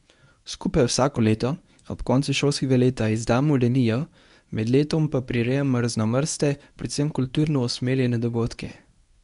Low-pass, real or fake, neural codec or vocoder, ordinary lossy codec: 10.8 kHz; fake; codec, 24 kHz, 0.9 kbps, WavTokenizer, medium speech release version 1; none